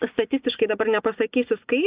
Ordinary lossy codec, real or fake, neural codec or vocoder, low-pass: Opus, 64 kbps; real; none; 3.6 kHz